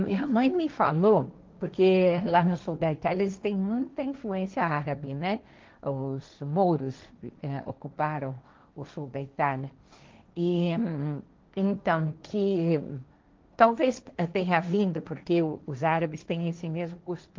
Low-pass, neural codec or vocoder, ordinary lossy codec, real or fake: 7.2 kHz; codec, 16 kHz, 1.1 kbps, Voila-Tokenizer; Opus, 24 kbps; fake